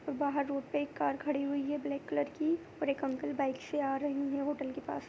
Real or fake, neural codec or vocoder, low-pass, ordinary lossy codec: real; none; none; none